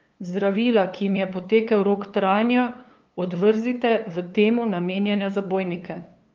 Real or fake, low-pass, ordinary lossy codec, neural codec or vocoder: fake; 7.2 kHz; Opus, 24 kbps; codec, 16 kHz, 2 kbps, FunCodec, trained on LibriTTS, 25 frames a second